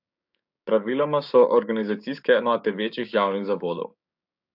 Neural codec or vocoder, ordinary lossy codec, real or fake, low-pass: codec, 16 kHz, 6 kbps, DAC; AAC, 48 kbps; fake; 5.4 kHz